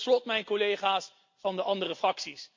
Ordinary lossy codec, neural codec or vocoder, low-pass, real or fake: MP3, 48 kbps; none; 7.2 kHz; real